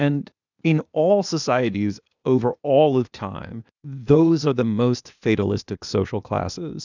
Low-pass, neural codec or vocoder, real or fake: 7.2 kHz; codec, 16 kHz, 0.8 kbps, ZipCodec; fake